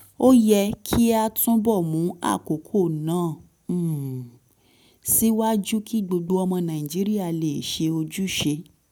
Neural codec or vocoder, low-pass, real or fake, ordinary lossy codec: none; none; real; none